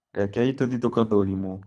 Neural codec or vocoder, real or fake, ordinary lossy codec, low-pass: codec, 24 kHz, 3 kbps, HILCodec; fake; none; none